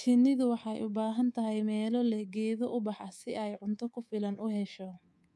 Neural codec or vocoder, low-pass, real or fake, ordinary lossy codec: codec, 24 kHz, 3.1 kbps, DualCodec; none; fake; none